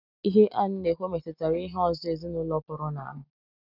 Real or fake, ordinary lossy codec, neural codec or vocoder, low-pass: real; none; none; 5.4 kHz